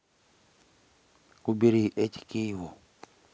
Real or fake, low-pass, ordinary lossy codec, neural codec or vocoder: real; none; none; none